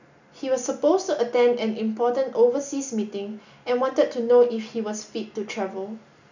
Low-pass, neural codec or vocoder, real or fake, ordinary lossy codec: 7.2 kHz; none; real; none